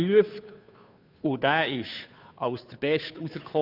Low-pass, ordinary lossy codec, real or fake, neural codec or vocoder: 5.4 kHz; none; fake; codec, 16 kHz, 2 kbps, FunCodec, trained on Chinese and English, 25 frames a second